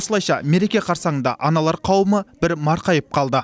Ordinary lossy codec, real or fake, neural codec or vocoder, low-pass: none; real; none; none